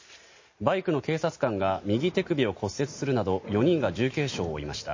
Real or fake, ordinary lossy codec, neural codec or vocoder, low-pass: real; MP3, 32 kbps; none; 7.2 kHz